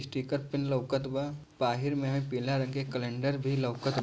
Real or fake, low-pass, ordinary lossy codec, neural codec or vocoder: real; none; none; none